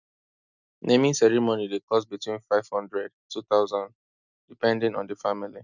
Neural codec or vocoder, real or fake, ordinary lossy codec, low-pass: none; real; none; 7.2 kHz